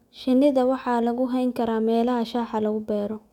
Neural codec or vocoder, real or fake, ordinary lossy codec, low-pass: autoencoder, 48 kHz, 128 numbers a frame, DAC-VAE, trained on Japanese speech; fake; none; 19.8 kHz